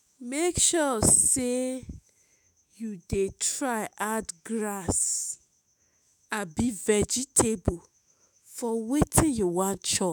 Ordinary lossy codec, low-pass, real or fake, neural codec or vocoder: none; none; fake; autoencoder, 48 kHz, 128 numbers a frame, DAC-VAE, trained on Japanese speech